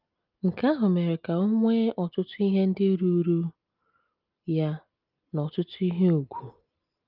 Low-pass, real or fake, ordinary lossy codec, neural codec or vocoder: 5.4 kHz; real; Opus, 24 kbps; none